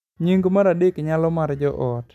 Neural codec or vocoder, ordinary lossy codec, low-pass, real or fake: none; none; 14.4 kHz; real